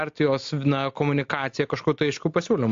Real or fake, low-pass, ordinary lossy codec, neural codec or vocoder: real; 7.2 kHz; AAC, 48 kbps; none